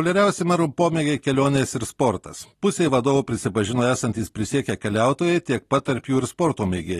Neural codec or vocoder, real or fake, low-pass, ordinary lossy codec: none; real; 19.8 kHz; AAC, 32 kbps